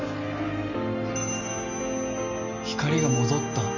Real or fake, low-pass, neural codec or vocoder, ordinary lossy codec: real; 7.2 kHz; none; none